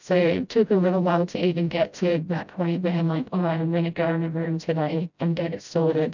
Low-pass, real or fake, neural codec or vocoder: 7.2 kHz; fake; codec, 16 kHz, 0.5 kbps, FreqCodec, smaller model